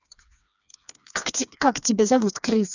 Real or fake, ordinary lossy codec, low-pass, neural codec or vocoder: fake; none; 7.2 kHz; codec, 16 kHz, 2 kbps, FreqCodec, smaller model